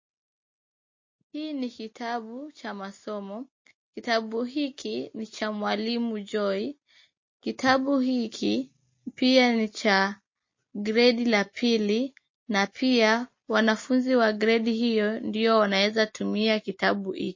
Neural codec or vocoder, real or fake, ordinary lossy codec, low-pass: none; real; MP3, 32 kbps; 7.2 kHz